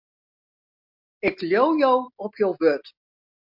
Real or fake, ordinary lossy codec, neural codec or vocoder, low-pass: real; MP3, 48 kbps; none; 5.4 kHz